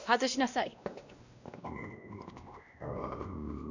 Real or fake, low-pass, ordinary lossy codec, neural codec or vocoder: fake; 7.2 kHz; none; codec, 16 kHz, 1 kbps, X-Codec, WavLM features, trained on Multilingual LibriSpeech